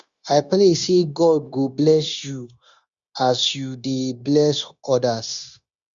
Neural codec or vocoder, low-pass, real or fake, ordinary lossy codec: codec, 16 kHz, 0.9 kbps, LongCat-Audio-Codec; 7.2 kHz; fake; Opus, 64 kbps